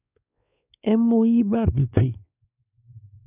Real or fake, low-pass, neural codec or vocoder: fake; 3.6 kHz; codec, 16 kHz, 2 kbps, X-Codec, WavLM features, trained on Multilingual LibriSpeech